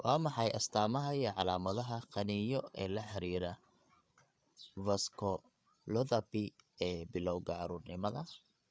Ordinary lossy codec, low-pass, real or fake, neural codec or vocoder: none; none; fake; codec, 16 kHz, 8 kbps, FreqCodec, larger model